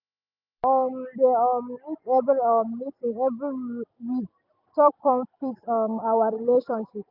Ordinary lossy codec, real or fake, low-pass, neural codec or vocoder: none; real; 5.4 kHz; none